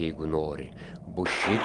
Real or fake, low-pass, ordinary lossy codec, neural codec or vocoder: real; 10.8 kHz; Opus, 32 kbps; none